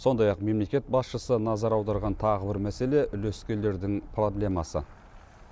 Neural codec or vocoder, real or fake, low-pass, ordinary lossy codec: none; real; none; none